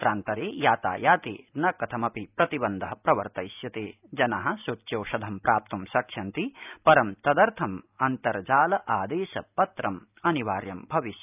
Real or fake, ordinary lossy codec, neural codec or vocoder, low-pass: real; none; none; 3.6 kHz